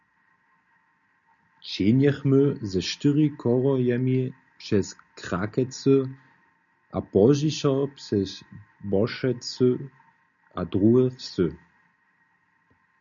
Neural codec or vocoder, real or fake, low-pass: none; real; 7.2 kHz